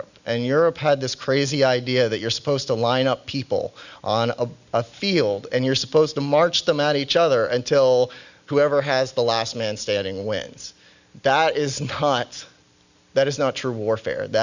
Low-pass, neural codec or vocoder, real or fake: 7.2 kHz; none; real